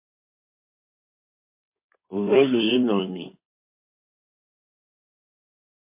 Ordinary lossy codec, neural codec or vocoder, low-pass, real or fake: MP3, 24 kbps; codec, 16 kHz in and 24 kHz out, 2.2 kbps, FireRedTTS-2 codec; 3.6 kHz; fake